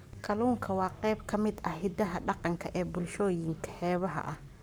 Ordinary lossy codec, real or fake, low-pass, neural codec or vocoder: none; fake; none; codec, 44.1 kHz, 7.8 kbps, Pupu-Codec